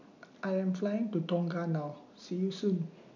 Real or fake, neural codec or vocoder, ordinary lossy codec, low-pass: real; none; none; 7.2 kHz